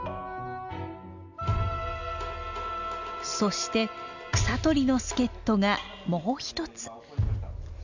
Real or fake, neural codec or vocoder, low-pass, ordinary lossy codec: real; none; 7.2 kHz; none